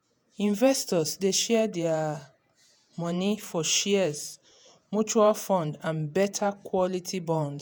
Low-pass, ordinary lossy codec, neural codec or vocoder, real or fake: none; none; vocoder, 48 kHz, 128 mel bands, Vocos; fake